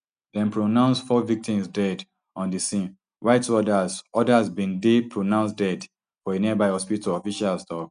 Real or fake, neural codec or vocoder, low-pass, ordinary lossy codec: real; none; 9.9 kHz; none